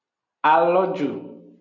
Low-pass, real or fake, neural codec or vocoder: 7.2 kHz; real; none